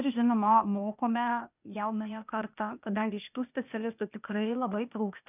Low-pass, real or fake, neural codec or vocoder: 3.6 kHz; fake; codec, 16 kHz, 0.8 kbps, ZipCodec